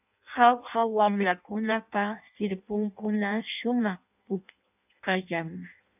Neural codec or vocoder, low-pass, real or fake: codec, 16 kHz in and 24 kHz out, 0.6 kbps, FireRedTTS-2 codec; 3.6 kHz; fake